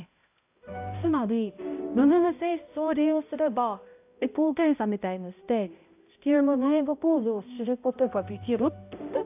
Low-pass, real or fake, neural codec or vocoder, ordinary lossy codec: 3.6 kHz; fake; codec, 16 kHz, 0.5 kbps, X-Codec, HuBERT features, trained on balanced general audio; AAC, 32 kbps